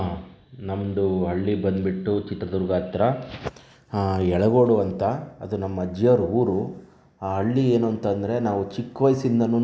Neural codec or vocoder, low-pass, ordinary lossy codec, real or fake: none; none; none; real